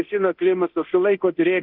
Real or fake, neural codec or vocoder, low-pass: fake; codec, 24 kHz, 0.9 kbps, DualCodec; 5.4 kHz